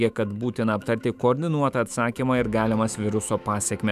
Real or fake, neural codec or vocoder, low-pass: fake; autoencoder, 48 kHz, 128 numbers a frame, DAC-VAE, trained on Japanese speech; 14.4 kHz